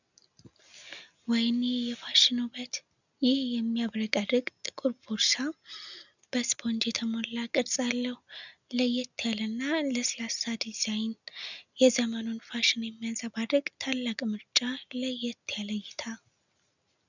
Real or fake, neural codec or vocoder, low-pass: real; none; 7.2 kHz